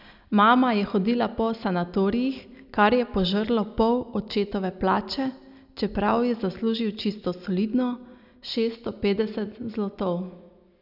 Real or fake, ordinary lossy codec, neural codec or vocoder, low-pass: real; none; none; 5.4 kHz